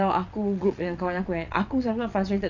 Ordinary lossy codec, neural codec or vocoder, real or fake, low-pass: none; vocoder, 22.05 kHz, 80 mel bands, WaveNeXt; fake; 7.2 kHz